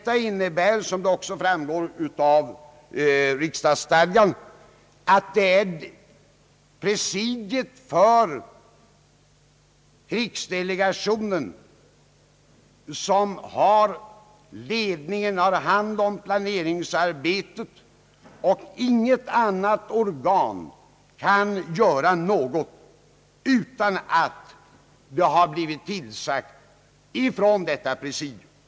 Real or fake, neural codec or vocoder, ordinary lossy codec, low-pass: real; none; none; none